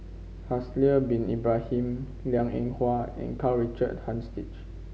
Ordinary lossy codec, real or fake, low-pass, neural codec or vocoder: none; real; none; none